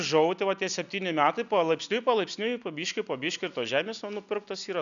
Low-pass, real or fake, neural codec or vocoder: 7.2 kHz; real; none